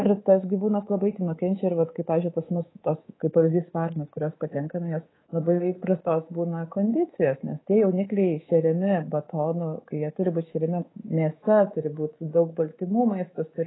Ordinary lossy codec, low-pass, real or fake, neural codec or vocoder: AAC, 16 kbps; 7.2 kHz; fake; codec, 24 kHz, 3.1 kbps, DualCodec